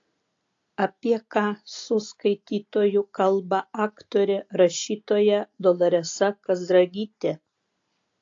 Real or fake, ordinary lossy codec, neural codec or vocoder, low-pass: real; AAC, 48 kbps; none; 7.2 kHz